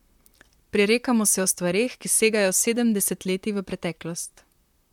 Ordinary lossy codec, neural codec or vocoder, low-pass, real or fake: MP3, 96 kbps; vocoder, 44.1 kHz, 128 mel bands, Pupu-Vocoder; 19.8 kHz; fake